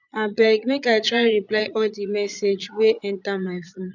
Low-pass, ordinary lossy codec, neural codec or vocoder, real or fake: 7.2 kHz; none; vocoder, 22.05 kHz, 80 mel bands, Vocos; fake